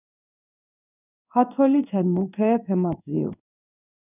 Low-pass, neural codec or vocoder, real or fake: 3.6 kHz; codec, 16 kHz in and 24 kHz out, 1 kbps, XY-Tokenizer; fake